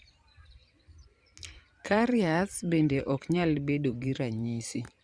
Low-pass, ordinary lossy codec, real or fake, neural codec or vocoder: 9.9 kHz; Opus, 64 kbps; fake; vocoder, 44.1 kHz, 128 mel bands every 512 samples, BigVGAN v2